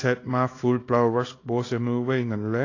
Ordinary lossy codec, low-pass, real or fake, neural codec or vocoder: AAC, 32 kbps; 7.2 kHz; fake; codec, 24 kHz, 0.9 kbps, WavTokenizer, small release